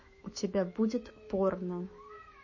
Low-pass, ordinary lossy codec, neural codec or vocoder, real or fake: 7.2 kHz; MP3, 32 kbps; autoencoder, 48 kHz, 128 numbers a frame, DAC-VAE, trained on Japanese speech; fake